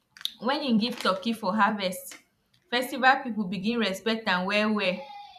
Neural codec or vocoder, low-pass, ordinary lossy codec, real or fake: vocoder, 44.1 kHz, 128 mel bands every 256 samples, BigVGAN v2; 14.4 kHz; none; fake